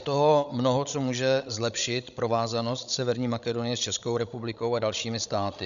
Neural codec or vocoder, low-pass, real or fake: codec, 16 kHz, 16 kbps, FreqCodec, larger model; 7.2 kHz; fake